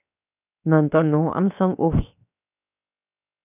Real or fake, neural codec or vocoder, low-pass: fake; codec, 16 kHz, 0.7 kbps, FocalCodec; 3.6 kHz